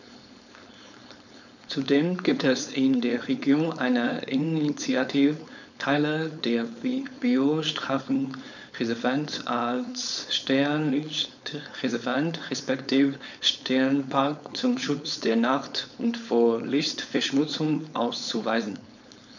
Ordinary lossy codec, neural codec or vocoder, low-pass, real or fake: none; codec, 16 kHz, 4.8 kbps, FACodec; 7.2 kHz; fake